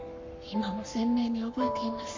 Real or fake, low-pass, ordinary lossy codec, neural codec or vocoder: fake; 7.2 kHz; MP3, 48 kbps; codec, 44.1 kHz, 7.8 kbps, Pupu-Codec